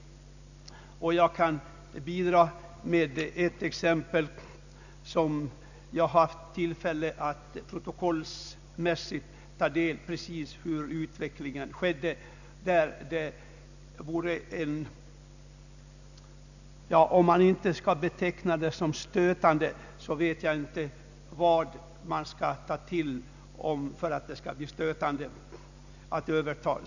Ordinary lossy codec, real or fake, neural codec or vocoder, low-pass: none; real; none; 7.2 kHz